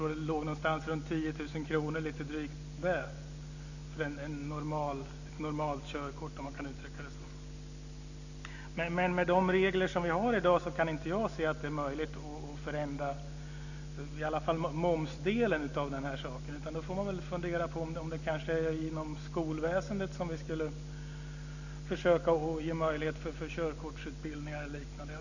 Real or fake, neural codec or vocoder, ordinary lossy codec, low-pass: real; none; none; 7.2 kHz